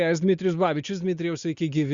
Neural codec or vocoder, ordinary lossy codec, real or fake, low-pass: none; Opus, 64 kbps; real; 7.2 kHz